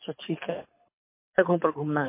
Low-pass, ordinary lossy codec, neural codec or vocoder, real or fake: 3.6 kHz; MP3, 24 kbps; codec, 24 kHz, 6 kbps, HILCodec; fake